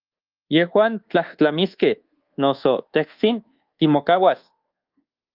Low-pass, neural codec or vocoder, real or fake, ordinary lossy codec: 5.4 kHz; codec, 24 kHz, 1.2 kbps, DualCodec; fake; Opus, 32 kbps